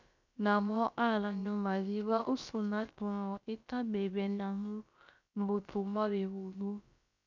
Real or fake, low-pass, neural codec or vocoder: fake; 7.2 kHz; codec, 16 kHz, about 1 kbps, DyCAST, with the encoder's durations